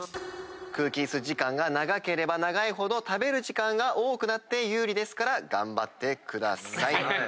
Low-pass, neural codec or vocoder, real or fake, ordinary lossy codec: none; none; real; none